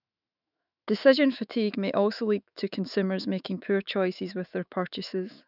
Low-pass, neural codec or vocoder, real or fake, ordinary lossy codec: 5.4 kHz; autoencoder, 48 kHz, 128 numbers a frame, DAC-VAE, trained on Japanese speech; fake; none